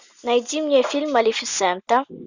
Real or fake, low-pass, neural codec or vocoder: real; 7.2 kHz; none